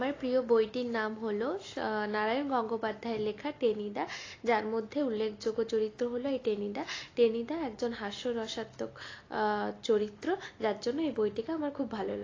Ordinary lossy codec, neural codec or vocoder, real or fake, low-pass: AAC, 32 kbps; none; real; 7.2 kHz